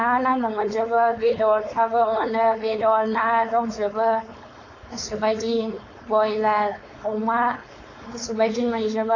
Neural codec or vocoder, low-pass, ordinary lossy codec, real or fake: codec, 16 kHz, 4.8 kbps, FACodec; 7.2 kHz; AAC, 32 kbps; fake